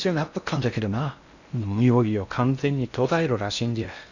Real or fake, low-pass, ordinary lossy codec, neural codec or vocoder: fake; 7.2 kHz; none; codec, 16 kHz in and 24 kHz out, 0.6 kbps, FocalCodec, streaming, 4096 codes